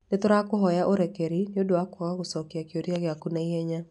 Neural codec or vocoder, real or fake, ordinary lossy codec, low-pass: none; real; none; 10.8 kHz